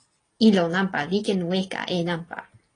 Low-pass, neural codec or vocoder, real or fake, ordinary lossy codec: 9.9 kHz; none; real; AAC, 32 kbps